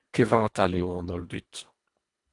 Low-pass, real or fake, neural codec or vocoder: 10.8 kHz; fake; codec, 24 kHz, 1.5 kbps, HILCodec